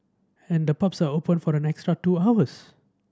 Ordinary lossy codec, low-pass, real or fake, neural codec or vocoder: none; none; real; none